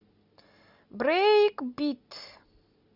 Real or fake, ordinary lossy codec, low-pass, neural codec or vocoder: real; Opus, 64 kbps; 5.4 kHz; none